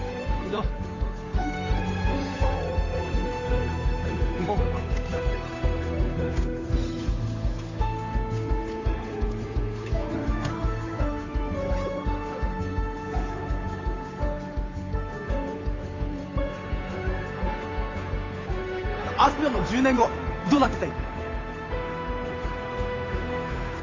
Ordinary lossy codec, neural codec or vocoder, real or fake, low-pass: AAC, 32 kbps; codec, 16 kHz, 8 kbps, FunCodec, trained on Chinese and English, 25 frames a second; fake; 7.2 kHz